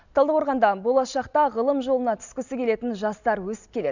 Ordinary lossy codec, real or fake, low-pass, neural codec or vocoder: none; real; 7.2 kHz; none